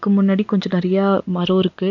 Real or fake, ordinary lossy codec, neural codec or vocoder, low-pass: real; none; none; 7.2 kHz